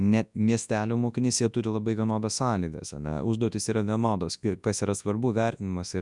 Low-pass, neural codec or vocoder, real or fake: 10.8 kHz; codec, 24 kHz, 0.9 kbps, WavTokenizer, large speech release; fake